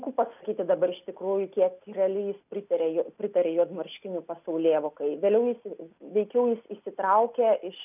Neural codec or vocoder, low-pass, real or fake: none; 3.6 kHz; real